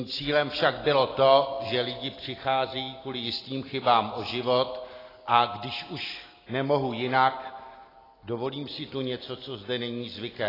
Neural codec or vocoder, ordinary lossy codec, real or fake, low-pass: none; AAC, 24 kbps; real; 5.4 kHz